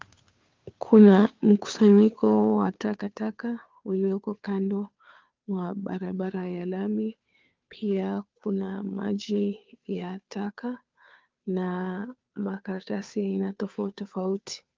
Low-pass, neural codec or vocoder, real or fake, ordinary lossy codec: 7.2 kHz; codec, 16 kHz, 2 kbps, FunCodec, trained on Chinese and English, 25 frames a second; fake; Opus, 32 kbps